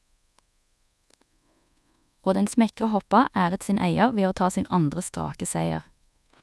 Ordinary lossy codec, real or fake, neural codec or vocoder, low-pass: none; fake; codec, 24 kHz, 1.2 kbps, DualCodec; none